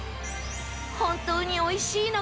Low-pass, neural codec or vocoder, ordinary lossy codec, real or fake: none; none; none; real